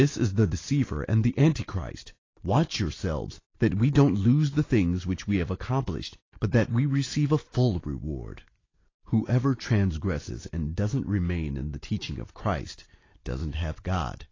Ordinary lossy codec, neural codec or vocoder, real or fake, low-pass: AAC, 32 kbps; none; real; 7.2 kHz